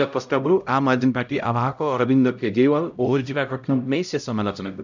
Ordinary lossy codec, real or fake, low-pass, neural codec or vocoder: none; fake; 7.2 kHz; codec, 16 kHz, 0.5 kbps, X-Codec, HuBERT features, trained on LibriSpeech